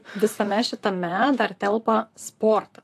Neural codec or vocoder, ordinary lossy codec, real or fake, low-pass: vocoder, 44.1 kHz, 128 mel bands, Pupu-Vocoder; AAC, 64 kbps; fake; 14.4 kHz